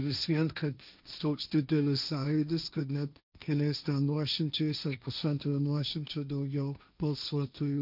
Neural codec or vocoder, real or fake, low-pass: codec, 16 kHz, 1.1 kbps, Voila-Tokenizer; fake; 5.4 kHz